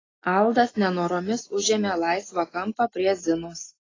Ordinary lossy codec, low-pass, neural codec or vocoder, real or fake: AAC, 32 kbps; 7.2 kHz; none; real